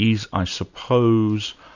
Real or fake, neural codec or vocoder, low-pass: real; none; 7.2 kHz